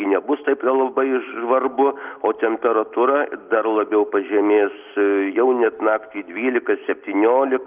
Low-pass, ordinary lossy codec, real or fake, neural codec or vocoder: 3.6 kHz; Opus, 32 kbps; real; none